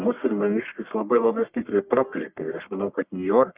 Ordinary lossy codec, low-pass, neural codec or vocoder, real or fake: Opus, 64 kbps; 3.6 kHz; codec, 44.1 kHz, 1.7 kbps, Pupu-Codec; fake